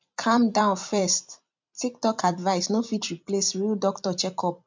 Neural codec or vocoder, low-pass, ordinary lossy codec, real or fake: vocoder, 44.1 kHz, 128 mel bands every 256 samples, BigVGAN v2; 7.2 kHz; MP3, 64 kbps; fake